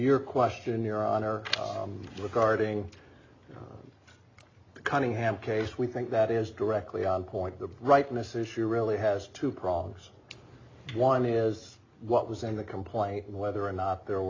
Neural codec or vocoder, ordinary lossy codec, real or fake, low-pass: none; AAC, 32 kbps; real; 7.2 kHz